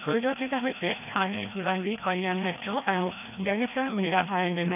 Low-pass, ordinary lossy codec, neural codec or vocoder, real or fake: 3.6 kHz; none; codec, 16 kHz, 1 kbps, FreqCodec, larger model; fake